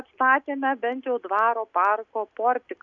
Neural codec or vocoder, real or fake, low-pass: none; real; 7.2 kHz